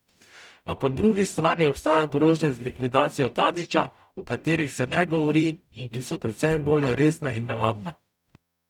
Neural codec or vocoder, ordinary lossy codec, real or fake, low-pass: codec, 44.1 kHz, 0.9 kbps, DAC; none; fake; 19.8 kHz